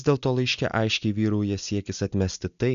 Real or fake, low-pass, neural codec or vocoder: real; 7.2 kHz; none